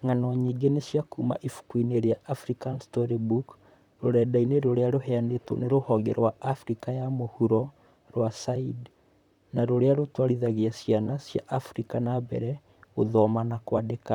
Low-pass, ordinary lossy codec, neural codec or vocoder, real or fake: 19.8 kHz; none; vocoder, 44.1 kHz, 128 mel bands, Pupu-Vocoder; fake